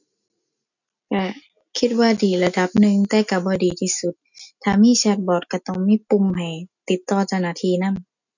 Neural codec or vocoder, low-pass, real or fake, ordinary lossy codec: none; 7.2 kHz; real; none